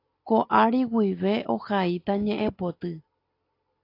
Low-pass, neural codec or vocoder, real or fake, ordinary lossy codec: 5.4 kHz; none; real; AAC, 32 kbps